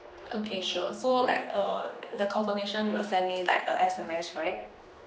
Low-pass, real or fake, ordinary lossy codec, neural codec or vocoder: none; fake; none; codec, 16 kHz, 2 kbps, X-Codec, HuBERT features, trained on general audio